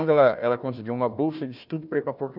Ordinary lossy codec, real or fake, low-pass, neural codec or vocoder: none; fake; 5.4 kHz; codec, 16 kHz, 1 kbps, FunCodec, trained on Chinese and English, 50 frames a second